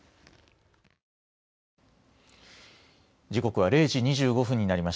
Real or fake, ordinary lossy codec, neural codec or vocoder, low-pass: real; none; none; none